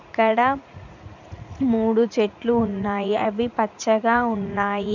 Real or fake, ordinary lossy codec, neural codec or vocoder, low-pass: fake; none; vocoder, 44.1 kHz, 80 mel bands, Vocos; 7.2 kHz